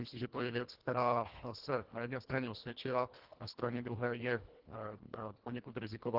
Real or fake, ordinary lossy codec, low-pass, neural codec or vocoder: fake; Opus, 16 kbps; 5.4 kHz; codec, 24 kHz, 1.5 kbps, HILCodec